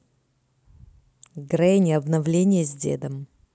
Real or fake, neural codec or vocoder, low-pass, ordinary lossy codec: real; none; none; none